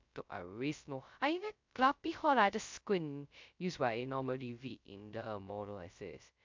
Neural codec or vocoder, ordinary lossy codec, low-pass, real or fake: codec, 16 kHz, 0.2 kbps, FocalCodec; MP3, 64 kbps; 7.2 kHz; fake